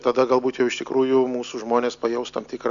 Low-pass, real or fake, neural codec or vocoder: 7.2 kHz; real; none